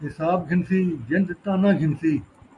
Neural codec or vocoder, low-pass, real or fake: none; 10.8 kHz; real